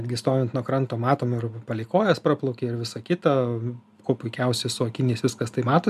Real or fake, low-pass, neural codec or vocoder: real; 14.4 kHz; none